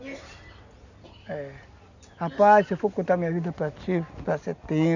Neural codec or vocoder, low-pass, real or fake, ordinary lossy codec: none; 7.2 kHz; real; none